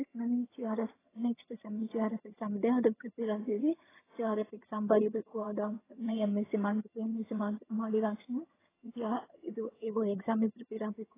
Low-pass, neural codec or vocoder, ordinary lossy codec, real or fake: 3.6 kHz; vocoder, 22.05 kHz, 80 mel bands, HiFi-GAN; AAC, 16 kbps; fake